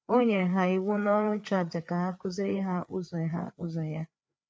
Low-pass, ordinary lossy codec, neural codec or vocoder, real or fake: none; none; codec, 16 kHz, 4 kbps, FreqCodec, larger model; fake